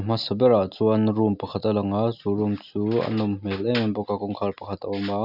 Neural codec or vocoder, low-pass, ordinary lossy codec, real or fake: none; 5.4 kHz; none; real